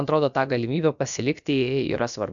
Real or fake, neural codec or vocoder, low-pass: fake; codec, 16 kHz, about 1 kbps, DyCAST, with the encoder's durations; 7.2 kHz